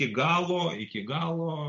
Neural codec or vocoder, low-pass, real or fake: none; 7.2 kHz; real